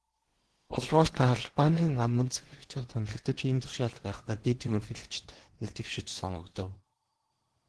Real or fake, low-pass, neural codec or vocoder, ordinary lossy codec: fake; 10.8 kHz; codec, 16 kHz in and 24 kHz out, 0.8 kbps, FocalCodec, streaming, 65536 codes; Opus, 16 kbps